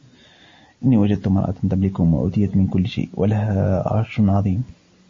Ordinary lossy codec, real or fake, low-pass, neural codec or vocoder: MP3, 32 kbps; real; 7.2 kHz; none